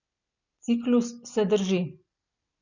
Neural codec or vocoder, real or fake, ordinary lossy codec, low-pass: none; real; none; 7.2 kHz